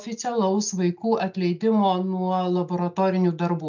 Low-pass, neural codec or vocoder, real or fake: 7.2 kHz; none; real